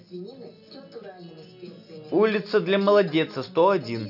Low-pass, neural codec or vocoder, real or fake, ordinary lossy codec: 5.4 kHz; none; real; none